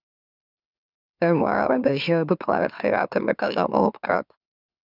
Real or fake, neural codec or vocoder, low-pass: fake; autoencoder, 44.1 kHz, a latent of 192 numbers a frame, MeloTTS; 5.4 kHz